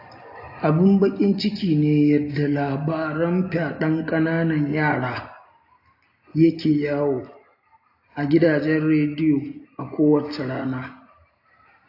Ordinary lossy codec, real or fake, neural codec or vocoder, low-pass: AAC, 32 kbps; real; none; 5.4 kHz